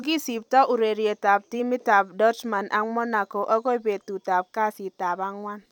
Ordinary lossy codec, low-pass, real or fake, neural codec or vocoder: none; 19.8 kHz; fake; vocoder, 44.1 kHz, 128 mel bands every 256 samples, BigVGAN v2